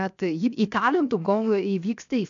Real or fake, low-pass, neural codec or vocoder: fake; 7.2 kHz; codec, 16 kHz, 0.7 kbps, FocalCodec